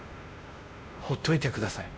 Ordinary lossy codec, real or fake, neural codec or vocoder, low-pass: none; fake; codec, 16 kHz, 1 kbps, X-Codec, WavLM features, trained on Multilingual LibriSpeech; none